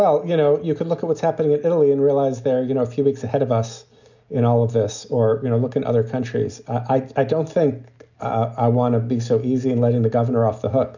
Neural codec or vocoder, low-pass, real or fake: none; 7.2 kHz; real